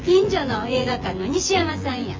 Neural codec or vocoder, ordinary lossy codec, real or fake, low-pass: vocoder, 24 kHz, 100 mel bands, Vocos; Opus, 32 kbps; fake; 7.2 kHz